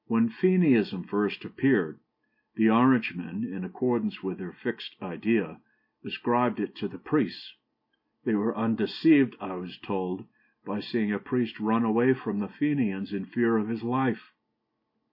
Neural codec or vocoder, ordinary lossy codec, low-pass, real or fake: none; MP3, 32 kbps; 5.4 kHz; real